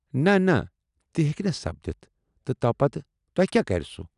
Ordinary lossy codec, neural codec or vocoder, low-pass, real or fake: none; none; 10.8 kHz; real